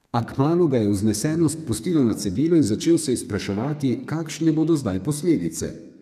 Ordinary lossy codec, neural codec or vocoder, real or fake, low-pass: none; codec, 32 kHz, 1.9 kbps, SNAC; fake; 14.4 kHz